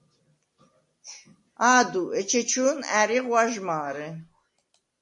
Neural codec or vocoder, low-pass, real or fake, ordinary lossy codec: none; 10.8 kHz; real; MP3, 48 kbps